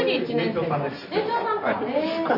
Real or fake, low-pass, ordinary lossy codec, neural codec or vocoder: real; 5.4 kHz; none; none